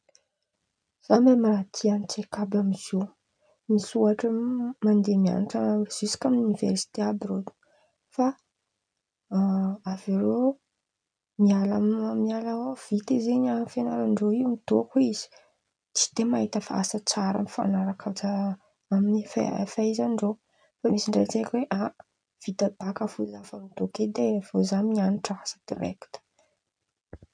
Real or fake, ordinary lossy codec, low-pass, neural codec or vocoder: real; MP3, 96 kbps; 9.9 kHz; none